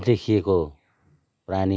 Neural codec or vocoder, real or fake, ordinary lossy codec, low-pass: none; real; none; none